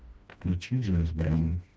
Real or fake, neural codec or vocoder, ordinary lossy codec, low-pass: fake; codec, 16 kHz, 1 kbps, FreqCodec, smaller model; none; none